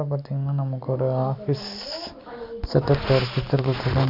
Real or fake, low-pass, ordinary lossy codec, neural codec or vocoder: real; 5.4 kHz; none; none